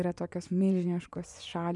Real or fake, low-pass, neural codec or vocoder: fake; 10.8 kHz; vocoder, 24 kHz, 100 mel bands, Vocos